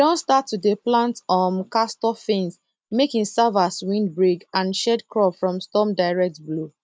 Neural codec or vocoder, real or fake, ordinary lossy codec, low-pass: none; real; none; none